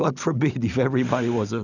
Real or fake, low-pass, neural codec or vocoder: real; 7.2 kHz; none